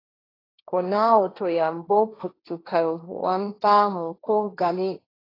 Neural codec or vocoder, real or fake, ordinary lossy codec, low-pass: codec, 16 kHz, 1.1 kbps, Voila-Tokenizer; fake; AAC, 24 kbps; 5.4 kHz